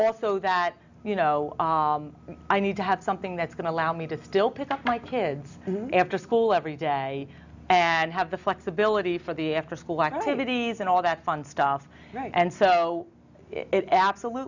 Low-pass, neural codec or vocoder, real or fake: 7.2 kHz; none; real